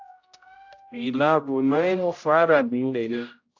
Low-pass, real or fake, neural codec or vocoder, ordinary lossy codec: 7.2 kHz; fake; codec, 16 kHz, 0.5 kbps, X-Codec, HuBERT features, trained on general audio; AAC, 48 kbps